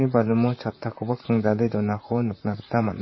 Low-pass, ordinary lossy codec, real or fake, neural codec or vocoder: 7.2 kHz; MP3, 24 kbps; fake; vocoder, 44.1 kHz, 128 mel bands, Pupu-Vocoder